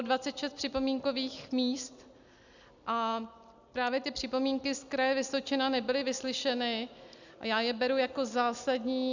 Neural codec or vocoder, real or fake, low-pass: none; real; 7.2 kHz